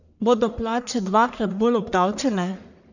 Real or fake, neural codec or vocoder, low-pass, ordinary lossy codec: fake; codec, 44.1 kHz, 1.7 kbps, Pupu-Codec; 7.2 kHz; none